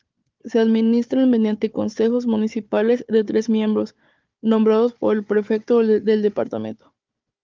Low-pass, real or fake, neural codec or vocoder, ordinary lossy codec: 7.2 kHz; fake; codec, 16 kHz, 4 kbps, FunCodec, trained on Chinese and English, 50 frames a second; Opus, 24 kbps